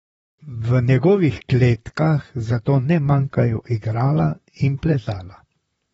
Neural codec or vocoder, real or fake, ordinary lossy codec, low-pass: vocoder, 44.1 kHz, 128 mel bands, Pupu-Vocoder; fake; AAC, 24 kbps; 19.8 kHz